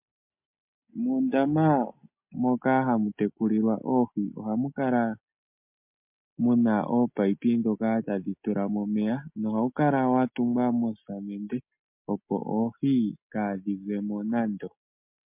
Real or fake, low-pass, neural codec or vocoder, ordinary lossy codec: real; 3.6 kHz; none; MP3, 32 kbps